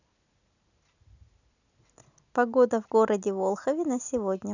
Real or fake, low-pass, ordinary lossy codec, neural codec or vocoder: real; 7.2 kHz; none; none